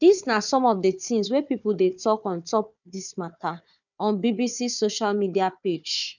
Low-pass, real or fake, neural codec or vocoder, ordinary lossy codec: 7.2 kHz; fake; codec, 16 kHz, 4 kbps, FunCodec, trained on Chinese and English, 50 frames a second; none